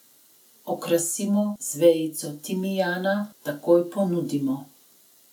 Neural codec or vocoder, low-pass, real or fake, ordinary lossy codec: none; 19.8 kHz; real; none